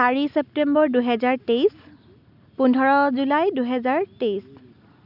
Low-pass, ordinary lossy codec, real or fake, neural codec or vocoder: 5.4 kHz; none; real; none